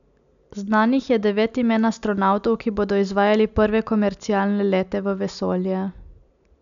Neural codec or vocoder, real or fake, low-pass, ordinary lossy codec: none; real; 7.2 kHz; none